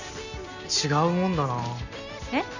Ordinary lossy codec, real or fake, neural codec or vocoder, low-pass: none; real; none; 7.2 kHz